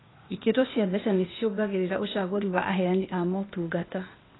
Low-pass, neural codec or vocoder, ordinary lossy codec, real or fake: 7.2 kHz; codec, 16 kHz, 0.8 kbps, ZipCodec; AAC, 16 kbps; fake